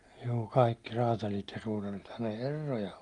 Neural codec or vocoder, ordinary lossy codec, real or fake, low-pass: none; Opus, 32 kbps; real; 10.8 kHz